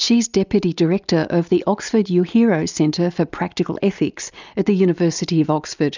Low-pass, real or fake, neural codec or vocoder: 7.2 kHz; real; none